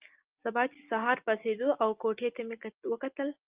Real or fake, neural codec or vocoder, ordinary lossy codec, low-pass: real; none; Opus, 32 kbps; 3.6 kHz